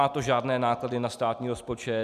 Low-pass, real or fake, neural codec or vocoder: 14.4 kHz; real; none